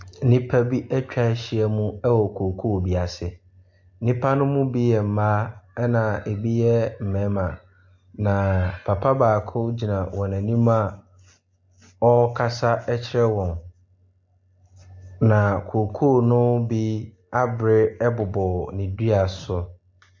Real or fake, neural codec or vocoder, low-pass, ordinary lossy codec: real; none; 7.2 kHz; MP3, 48 kbps